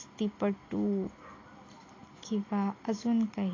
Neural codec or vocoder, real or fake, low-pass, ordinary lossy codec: none; real; 7.2 kHz; AAC, 32 kbps